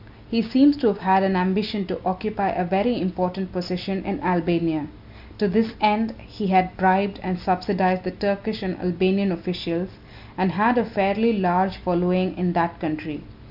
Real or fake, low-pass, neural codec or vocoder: real; 5.4 kHz; none